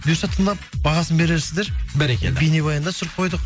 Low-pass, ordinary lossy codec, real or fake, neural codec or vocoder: none; none; real; none